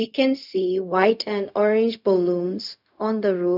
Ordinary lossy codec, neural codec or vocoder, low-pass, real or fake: none; codec, 16 kHz, 0.4 kbps, LongCat-Audio-Codec; 5.4 kHz; fake